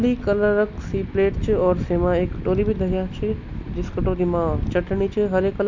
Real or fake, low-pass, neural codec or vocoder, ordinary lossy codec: real; 7.2 kHz; none; MP3, 64 kbps